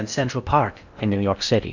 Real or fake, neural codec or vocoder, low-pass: fake; codec, 16 kHz in and 24 kHz out, 0.6 kbps, FocalCodec, streaming, 2048 codes; 7.2 kHz